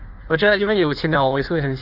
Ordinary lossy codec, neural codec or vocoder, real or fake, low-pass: none; codec, 16 kHz in and 24 kHz out, 1.1 kbps, FireRedTTS-2 codec; fake; 5.4 kHz